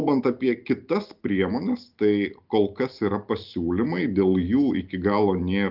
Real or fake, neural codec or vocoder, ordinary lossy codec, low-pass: real; none; Opus, 32 kbps; 5.4 kHz